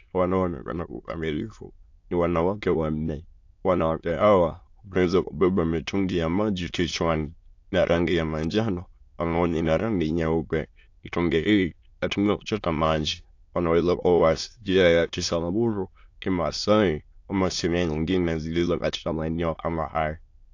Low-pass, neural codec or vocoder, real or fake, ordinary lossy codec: 7.2 kHz; autoencoder, 22.05 kHz, a latent of 192 numbers a frame, VITS, trained on many speakers; fake; AAC, 48 kbps